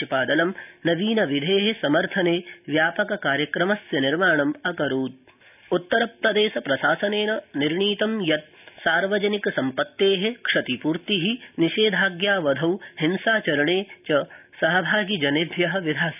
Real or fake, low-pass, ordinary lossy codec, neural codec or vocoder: real; 3.6 kHz; none; none